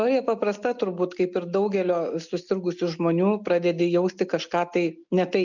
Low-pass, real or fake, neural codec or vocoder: 7.2 kHz; real; none